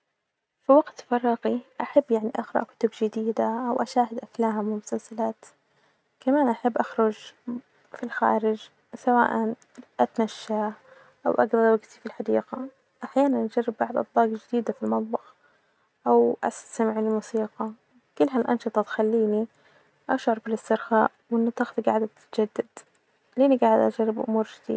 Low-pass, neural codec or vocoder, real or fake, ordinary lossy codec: none; none; real; none